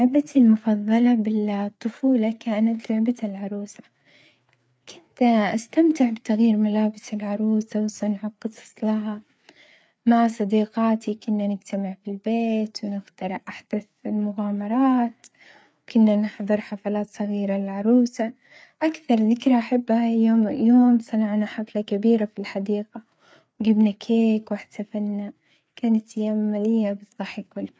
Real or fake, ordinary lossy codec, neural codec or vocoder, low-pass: fake; none; codec, 16 kHz, 4 kbps, FreqCodec, larger model; none